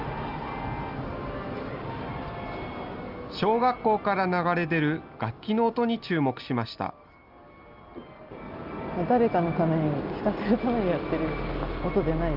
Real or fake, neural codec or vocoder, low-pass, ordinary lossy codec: real; none; 5.4 kHz; Opus, 24 kbps